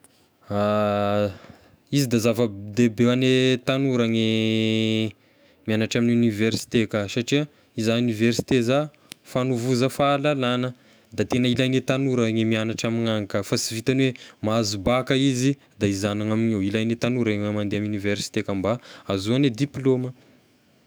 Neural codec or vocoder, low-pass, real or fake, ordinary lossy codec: autoencoder, 48 kHz, 128 numbers a frame, DAC-VAE, trained on Japanese speech; none; fake; none